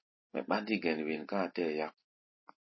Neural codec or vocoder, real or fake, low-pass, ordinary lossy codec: none; real; 7.2 kHz; MP3, 24 kbps